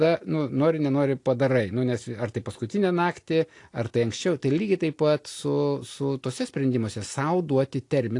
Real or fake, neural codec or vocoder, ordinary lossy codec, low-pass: real; none; AAC, 48 kbps; 10.8 kHz